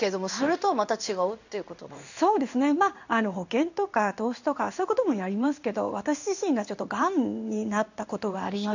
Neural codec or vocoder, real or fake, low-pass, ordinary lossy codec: codec, 16 kHz in and 24 kHz out, 1 kbps, XY-Tokenizer; fake; 7.2 kHz; none